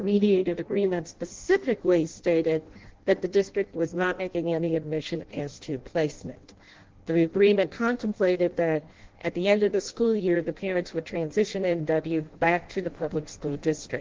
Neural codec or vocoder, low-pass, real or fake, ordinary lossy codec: codec, 16 kHz in and 24 kHz out, 0.6 kbps, FireRedTTS-2 codec; 7.2 kHz; fake; Opus, 16 kbps